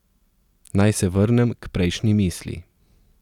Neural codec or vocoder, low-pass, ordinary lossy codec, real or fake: none; 19.8 kHz; none; real